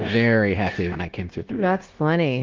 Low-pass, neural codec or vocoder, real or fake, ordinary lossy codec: 7.2 kHz; codec, 16 kHz, 0.9 kbps, LongCat-Audio-Codec; fake; Opus, 16 kbps